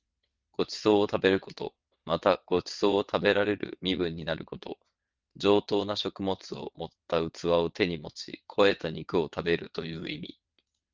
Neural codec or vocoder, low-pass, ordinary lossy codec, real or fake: vocoder, 22.05 kHz, 80 mel bands, WaveNeXt; 7.2 kHz; Opus, 32 kbps; fake